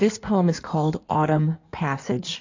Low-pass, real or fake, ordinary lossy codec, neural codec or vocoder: 7.2 kHz; fake; AAC, 48 kbps; codec, 16 kHz in and 24 kHz out, 1.1 kbps, FireRedTTS-2 codec